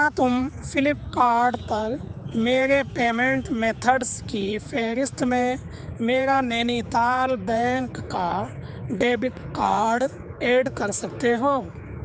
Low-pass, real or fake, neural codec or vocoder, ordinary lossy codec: none; fake; codec, 16 kHz, 4 kbps, X-Codec, HuBERT features, trained on general audio; none